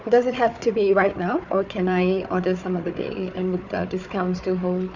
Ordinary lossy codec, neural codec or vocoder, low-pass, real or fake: none; codec, 16 kHz, 4 kbps, FunCodec, trained on Chinese and English, 50 frames a second; 7.2 kHz; fake